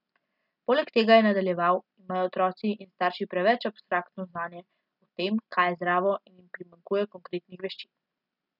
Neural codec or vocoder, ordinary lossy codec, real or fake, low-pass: none; none; real; 5.4 kHz